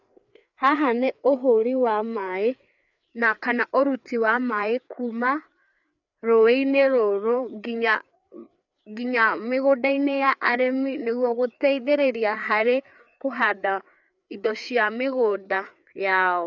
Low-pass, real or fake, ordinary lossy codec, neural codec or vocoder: 7.2 kHz; fake; none; codec, 16 kHz, 4 kbps, FreqCodec, larger model